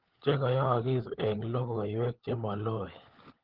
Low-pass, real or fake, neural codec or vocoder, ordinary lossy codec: 5.4 kHz; fake; codec, 16 kHz, 16 kbps, FunCodec, trained on LibriTTS, 50 frames a second; Opus, 16 kbps